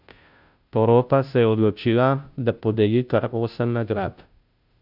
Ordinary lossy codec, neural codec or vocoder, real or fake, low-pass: none; codec, 16 kHz, 0.5 kbps, FunCodec, trained on Chinese and English, 25 frames a second; fake; 5.4 kHz